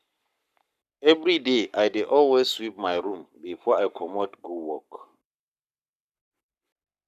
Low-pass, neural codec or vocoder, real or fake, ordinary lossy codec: 14.4 kHz; codec, 44.1 kHz, 7.8 kbps, Pupu-Codec; fake; none